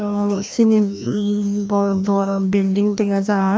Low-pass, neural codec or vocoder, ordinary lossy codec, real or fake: none; codec, 16 kHz, 1 kbps, FreqCodec, larger model; none; fake